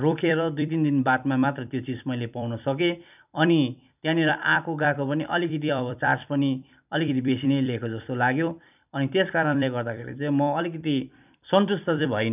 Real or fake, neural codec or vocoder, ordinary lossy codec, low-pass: fake; vocoder, 44.1 kHz, 128 mel bands every 256 samples, BigVGAN v2; none; 3.6 kHz